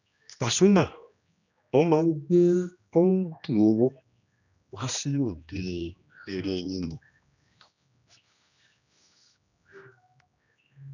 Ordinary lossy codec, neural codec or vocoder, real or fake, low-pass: none; codec, 16 kHz, 1 kbps, X-Codec, HuBERT features, trained on general audio; fake; 7.2 kHz